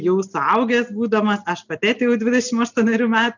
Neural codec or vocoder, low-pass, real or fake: none; 7.2 kHz; real